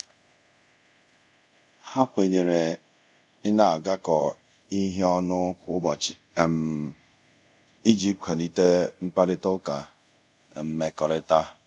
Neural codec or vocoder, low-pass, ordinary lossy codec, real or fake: codec, 24 kHz, 0.5 kbps, DualCodec; 10.8 kHz; none; fake